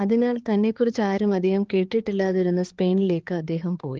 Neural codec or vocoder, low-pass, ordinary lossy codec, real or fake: codec, 16 kHz, 4 kbps, X-Codec, HuBERT features, trained on balanced general audio; 7.2 kHz; Opus, 16 kbps; fake